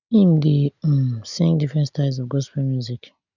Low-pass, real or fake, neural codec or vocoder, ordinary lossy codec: 7.2 kHz; real; none; none